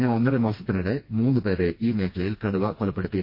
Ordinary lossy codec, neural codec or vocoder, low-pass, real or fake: MP3, 32 kbps; codec, 16 kHz, 2 kbps, FreqCodec, smaller model; 5.4 kHz; fake